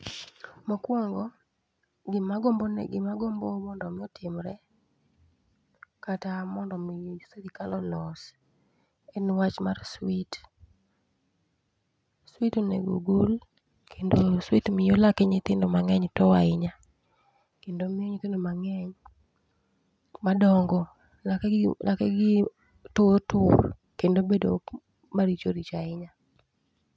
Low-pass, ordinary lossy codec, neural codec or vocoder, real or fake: none; none; none; real